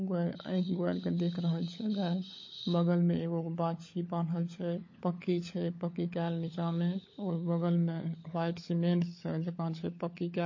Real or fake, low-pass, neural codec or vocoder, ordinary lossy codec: fake; 7.2 kHz; codec, 16 kHz, 16 kbps, FunCodec, trained on LibriTTS, 50 frames a second; MP3, 32 kbps